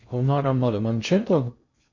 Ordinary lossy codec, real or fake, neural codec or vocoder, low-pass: MP3, 64 kbps; fake; codec, 16 kHz in and 24 kHz out, 0.8 kbps, FocalCodec, streaming, 65536 codes; 7.2 kHz